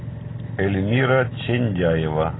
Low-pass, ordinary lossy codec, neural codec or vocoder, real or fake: 7.2 kHz; AAC, 16 kbps; none; real